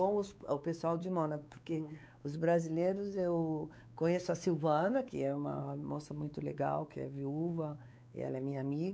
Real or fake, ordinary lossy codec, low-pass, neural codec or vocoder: fake; none; none; codec, 16 kHz, 4 kbps, X-Codec, WavLM features, trained on Multilingual LibriSpeech